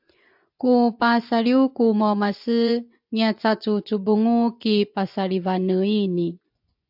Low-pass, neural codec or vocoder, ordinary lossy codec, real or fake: 5.4 kHz; vocoder, 24 kHz, 100 mel bands, Vocos; AAC, 48 kbps; fake